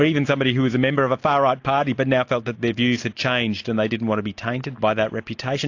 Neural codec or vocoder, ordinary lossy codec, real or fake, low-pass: none; AAC, 48 kbps; real; 7.2 kHz